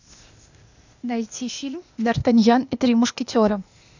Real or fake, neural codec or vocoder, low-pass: fake; codec, 16 kHz, 0.8 kbps, ZipCodec; 7.2 kHz